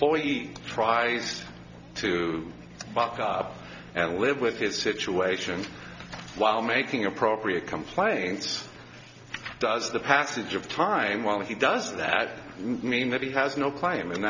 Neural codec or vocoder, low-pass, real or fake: none; 7.2 kHz; real